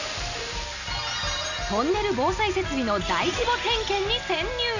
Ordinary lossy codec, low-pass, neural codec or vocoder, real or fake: none; 7.2 kHz; none; real